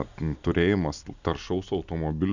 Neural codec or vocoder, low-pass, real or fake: none; 7.2 kHz; real